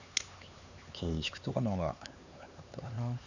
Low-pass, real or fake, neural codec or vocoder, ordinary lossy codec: 7.2 kHz; fake; codec, 16 kHz, 4 kbps, X-Codec, HuBERT features, trained on LibriSpeech; none